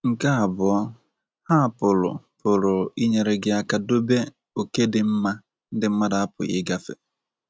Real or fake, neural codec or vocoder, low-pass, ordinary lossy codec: real; none; none; none